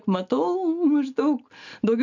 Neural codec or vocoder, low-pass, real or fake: none; 7.2 kHz; real